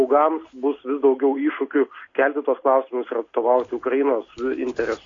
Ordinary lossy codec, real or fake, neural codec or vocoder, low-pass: AAC, 32 kbps; real; none; 7.2 kHz